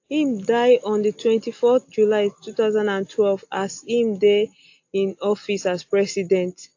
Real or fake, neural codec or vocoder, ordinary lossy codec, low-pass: real; none; AAC, 48 kbps; 7.2 kHz